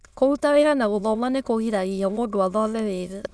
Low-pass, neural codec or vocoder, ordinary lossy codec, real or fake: none; autoencoder, 22.05 kHz, a latent of 192 numbers a frame, VITS, trained on many speakers; none; fake